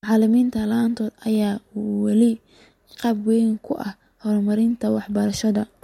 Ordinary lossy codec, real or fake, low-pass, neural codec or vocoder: MP3, 64 kbps; real; 19.8 kHz; none